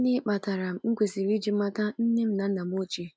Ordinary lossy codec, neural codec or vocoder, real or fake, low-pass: none; none; real; none